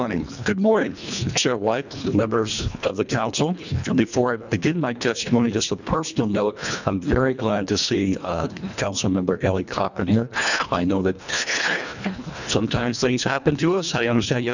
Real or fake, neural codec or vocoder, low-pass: fake; codec, 24 kHz, 1.5 kbps, HILCodec; 7.2 kHz